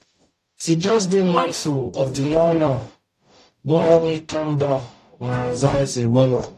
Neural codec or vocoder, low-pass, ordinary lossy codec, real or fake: codec, 44.1 kHz, 0.9 kbps, DAC; 14.4 kHz; AAC, 64 kbps; fake